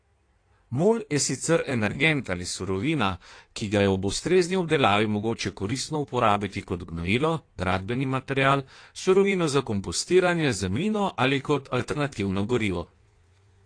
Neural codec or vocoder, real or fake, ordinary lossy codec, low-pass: codec, 16 kHz in and 24 kHz out, 1.1 kbps, FireRedTTS-2 codec; fake; AAC, 48 kbps; 9.9 kHz